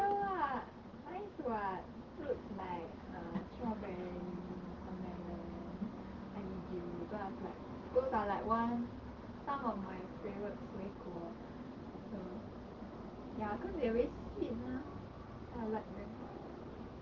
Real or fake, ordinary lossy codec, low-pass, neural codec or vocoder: real; Opus, 16 kbps; 7.2 kHz; none